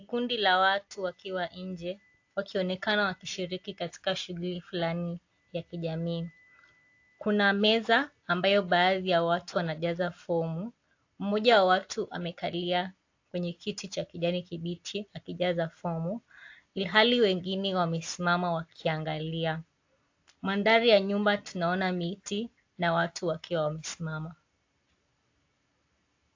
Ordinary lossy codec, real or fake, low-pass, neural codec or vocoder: AAC, 48 kbps; real; 7.2 kHz; none